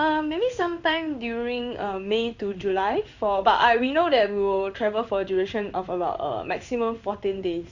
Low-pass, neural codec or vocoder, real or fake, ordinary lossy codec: 7.2 kHz; codec, 16 kHz in and 24 kHz out, 1 kbps, XY-Tokenizer; fake; Opus, 64 kbps